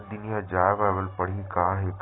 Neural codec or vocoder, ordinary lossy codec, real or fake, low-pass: none; AAC, 16 kbps; real; 7.2 kHz